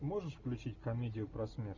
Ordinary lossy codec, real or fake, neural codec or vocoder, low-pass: Opus, 64 kbps; real; none; 7.2 kHz